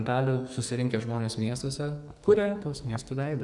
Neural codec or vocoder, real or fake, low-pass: codec, 44.1 kHz, 2.6 kbps, SNAC; fake; 10.8 kHz